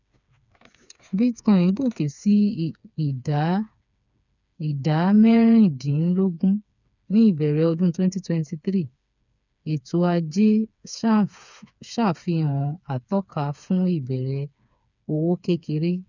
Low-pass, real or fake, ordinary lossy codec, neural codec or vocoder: 7.2 kHz; fake; none; codec, 16 kHz, 4 kbps, FreqCodec, smaller model